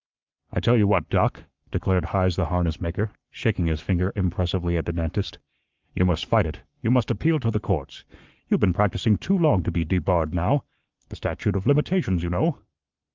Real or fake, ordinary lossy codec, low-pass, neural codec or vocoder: fake; Opus, 24 kbps; 7.2 kHz; codec, 44.1 kHz, 7.8 kbps, Pupu-Codec